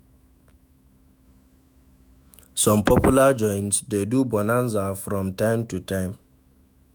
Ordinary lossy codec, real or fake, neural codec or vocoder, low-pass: none; fake; autoencoder, 48 kHz, 128 numbers a frame, DAC-VAE, trained on Japanese speech; none